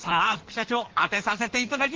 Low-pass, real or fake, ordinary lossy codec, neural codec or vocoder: 7.2 kHz; fake; Opus, 16 kbps; codec, 16 kHz in and 24 kHz out, 1.1 kbps, FireRedTTS-2 codec